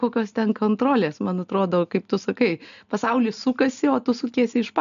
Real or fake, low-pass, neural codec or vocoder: real; 7.2 kHz; none